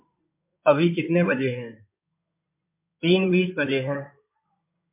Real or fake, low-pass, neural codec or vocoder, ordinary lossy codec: fake; 3.6 kHz; codec, 16 kHz in and 24 kHz out, 2.2 kbps, FireRedTTS-2 codec; MP3, 32 kbps